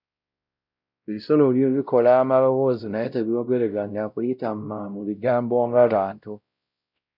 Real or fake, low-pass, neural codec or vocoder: fake; 5.4 kHz; codec, 16 kHz, 0.5 kbps, X-Codec, WavLM features, trained on Multilingual LibriSpeech